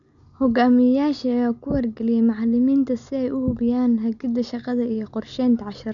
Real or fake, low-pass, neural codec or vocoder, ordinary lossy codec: real; 7.2 kHz; none; none